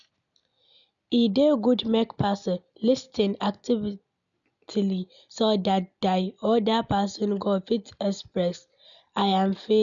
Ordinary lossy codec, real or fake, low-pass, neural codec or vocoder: none; real; 7.2 kHz; none